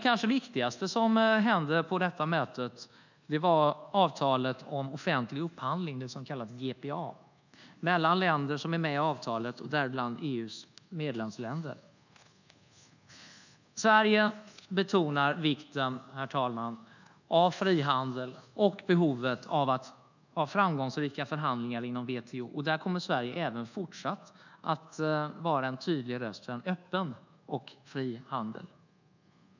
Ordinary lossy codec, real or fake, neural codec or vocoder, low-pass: none; fake; codec, 24 kHz, 1.2 kbps, DualCodec; 7.2 kHz